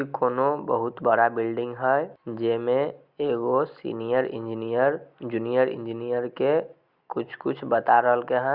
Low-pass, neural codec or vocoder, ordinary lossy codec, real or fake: 5.4 kHz; none; Opus, 64 kbps; real